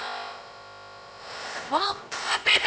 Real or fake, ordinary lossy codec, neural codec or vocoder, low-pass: fake; none; codec, 16 kHz, about 1 kbps, DyCAST, with the encoder's durations; none